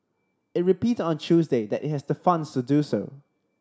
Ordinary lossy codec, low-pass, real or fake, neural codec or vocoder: none; none; real; none